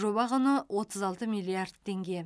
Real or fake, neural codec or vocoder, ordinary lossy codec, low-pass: real; none; none; none